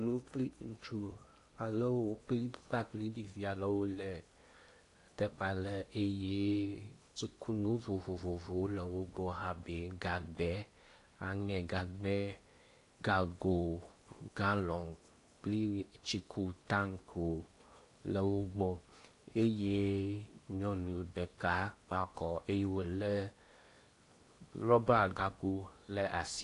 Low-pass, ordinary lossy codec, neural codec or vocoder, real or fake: 10.8 kHz; AAC, 48 kbps; codec, 16 kHz in and 24 kHz out, 0.8 kbps, FocalCodec, streaming, 65536 codes; fake